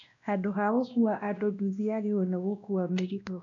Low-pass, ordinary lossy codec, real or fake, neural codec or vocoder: 7.2 kHz; none; fake; codec, 16 kHz, 1 kbps, X-Codec, WavLM features, trained on Multilingual LibriSpeech